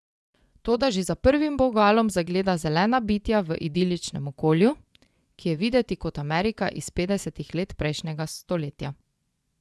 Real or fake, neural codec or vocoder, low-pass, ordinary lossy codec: fake; vocoder, 24 kHz, 100 mel bands, Vocos; none; none